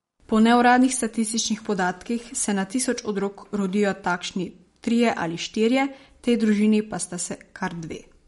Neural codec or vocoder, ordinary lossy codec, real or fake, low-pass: none; MP3, 48 kbps; real; 19.8 kHz